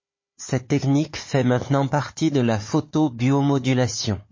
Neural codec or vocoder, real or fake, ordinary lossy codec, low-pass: codec, 16 kHz, 4 kbps, FunCodec, trained on Chinese and English, 50 frames a second; fake; MP3, 32 kbps; 7.2 kHz